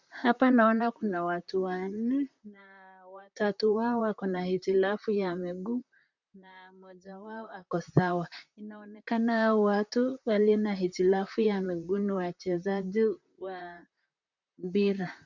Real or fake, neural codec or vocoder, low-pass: fake; vocoder, 44.1 kHz, 128 mel bands, Pupu-Vocoder; 7.2 kHz